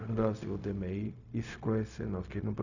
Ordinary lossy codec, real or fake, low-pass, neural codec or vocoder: none; fake; 7.2 kHz; codec, 16 kHz, 0.4 kbps, LongCat-Audio-Codec